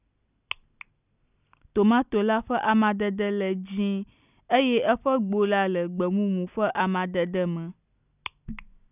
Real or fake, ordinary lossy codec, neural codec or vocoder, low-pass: real; none; none; 3.6 kHz